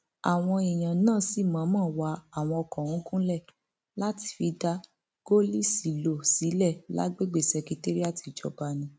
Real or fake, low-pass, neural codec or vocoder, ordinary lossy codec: real; none; none; none